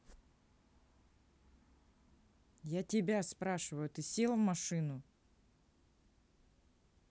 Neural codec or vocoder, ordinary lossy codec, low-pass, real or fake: none; none; none; real